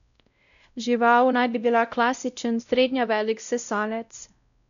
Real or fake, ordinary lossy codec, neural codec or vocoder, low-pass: fake; none; codec, 16 kHz, 0.5 kbps, X-Codec, WavLM features, trained on Multilingual LibriSpeech; 7.2 kHz